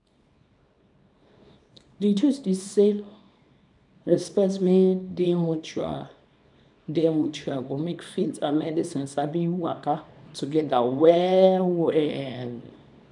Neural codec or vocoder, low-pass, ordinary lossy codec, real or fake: codec, 24 kHz, 0.9 kbps, WavTokenizer, small release; 10.8 kHz; none; fake